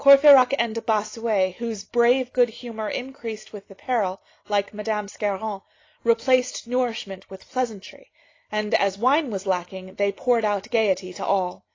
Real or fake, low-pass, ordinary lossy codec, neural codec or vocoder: real; 7.2 kHz; AAC, 32 kbps; none